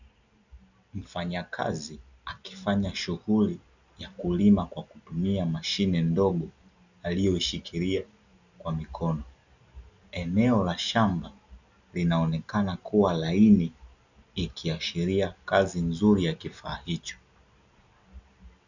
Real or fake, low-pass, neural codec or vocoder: real; 7.2 kHz; none